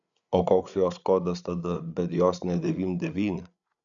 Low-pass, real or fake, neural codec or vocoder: 7.2 kHz; fake; codec, 16 kHz, 8 kbps, FreqCodec, larger model